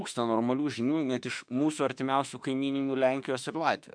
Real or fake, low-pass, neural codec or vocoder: fake; 9.9 kHz; autoencoder, 48 kHz, 32 numbers a frame, DAC-VAE, trained on Japanese speech